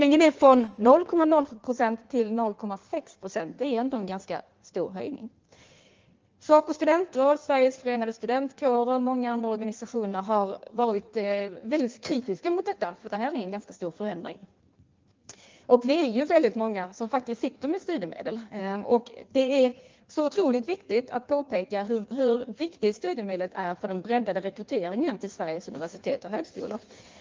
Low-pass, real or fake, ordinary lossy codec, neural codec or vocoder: 7.2 kHz; fake; Opus, 24 kbps; codec, 16 kHz in and 24 kHz out, 1.1 kbps, FireRedTTS-2 codec